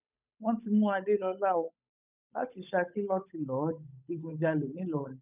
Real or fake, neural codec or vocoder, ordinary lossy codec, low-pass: fake; codec, 16 kHz, 8 kbps, FunCodec, trained on Chinese and English, 25 frames a second; none; 3.6 kHz